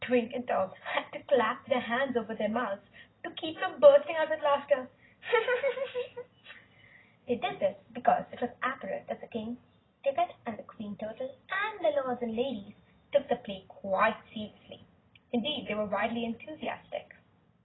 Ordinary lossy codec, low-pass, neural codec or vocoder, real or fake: AAC, 16 kbps; 7.2 kHz; none; real